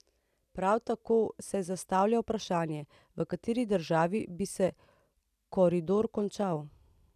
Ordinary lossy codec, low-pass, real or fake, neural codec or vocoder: none; 14.4 kHz; real; none